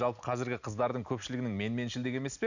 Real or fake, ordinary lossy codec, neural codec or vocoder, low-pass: real; none; none; 7.2 kHz